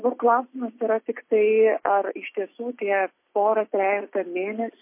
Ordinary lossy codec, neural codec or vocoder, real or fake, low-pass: MP3, 24 kbps; none; real; 3.6 kHz